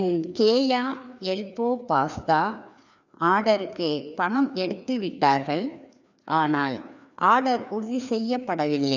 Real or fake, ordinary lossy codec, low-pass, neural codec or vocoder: fake; none; 7.2 kHz; codec, 16 kHz, 2 kbps, FreqCodec, larger model